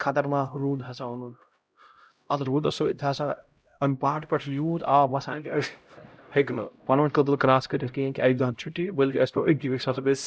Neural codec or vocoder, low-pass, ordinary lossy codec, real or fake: codec, 16 kHz, 0.5 kbps, X-Codec, HuBERT features, trained on LibriSpeech; none; none; fake